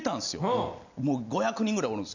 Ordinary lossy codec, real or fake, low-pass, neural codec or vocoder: none; real; 7.2 kHz; none